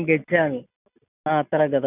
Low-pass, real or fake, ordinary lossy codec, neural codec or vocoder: 3.6 kHz; real; none; none